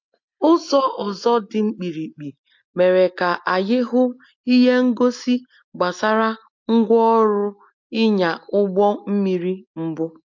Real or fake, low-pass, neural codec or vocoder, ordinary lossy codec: real; 7.2 kHz; none; MP3, 48 kbps